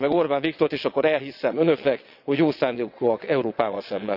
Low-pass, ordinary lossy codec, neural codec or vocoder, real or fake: 5.4 kHz; none; vocoder, 22.05 kHz, 80 mel bands, WaveNeXt; fake